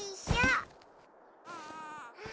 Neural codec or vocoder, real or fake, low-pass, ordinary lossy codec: none; real; none; none